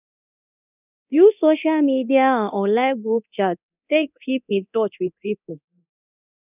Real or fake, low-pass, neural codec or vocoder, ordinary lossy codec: fake; 3.6 kHz; codec, 24 kHz, 0.9 kbps, DualCodec; none